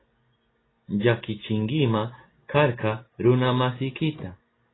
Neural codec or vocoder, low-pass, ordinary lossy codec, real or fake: none; 7.2 kHz; AAC, 16 kbps; real